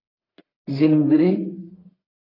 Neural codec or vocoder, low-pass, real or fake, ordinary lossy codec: codec, 44.1 kHz, 1.7 kbps, Pupu-Codec; 5.4 kHz; fake; AAC, 24 kbps